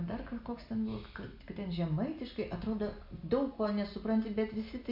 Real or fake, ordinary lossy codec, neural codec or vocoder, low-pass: real; MP3, 48 kbps; none; 5.4 kHz